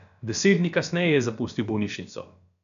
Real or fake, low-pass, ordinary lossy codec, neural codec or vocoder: fake; 7.2 kHz; none; codec, 16 kHz, about 1 kbps, DyCAST, with the encoder's durations